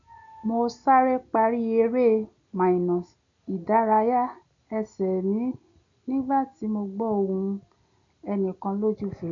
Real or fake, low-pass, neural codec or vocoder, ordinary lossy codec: real; 7.2 kHz; none; none